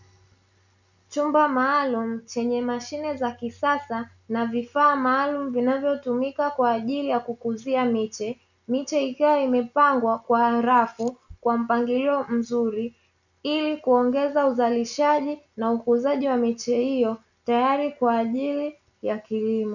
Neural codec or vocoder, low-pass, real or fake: none; 7.2 kHz; real